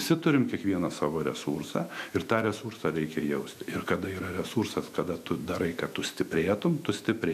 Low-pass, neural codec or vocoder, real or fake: 14.4 kHz; autoencoder, 48 kHz, 128 numbers a frame, DAC-VAE, trained on Japanese speech; fake